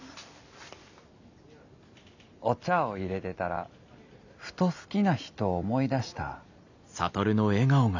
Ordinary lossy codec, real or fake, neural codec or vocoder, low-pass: none; real; none; 7.2 kHz